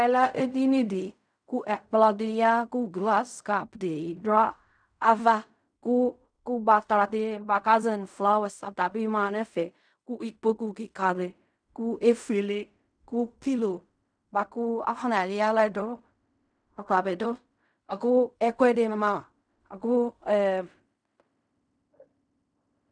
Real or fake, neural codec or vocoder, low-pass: fake; codec, 16 kHz in and 24 kHz out, 0.4 kbps, LongCat-Audio-Codec, fine tuned four codebook decoder; 9.9 kHz